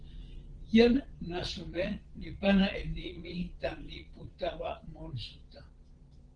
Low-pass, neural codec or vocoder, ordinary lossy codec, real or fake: 9.9 kHz; vocoder, 22.05 kHz, 80 mel bands, Vocos; Opus, 16 kbps; fake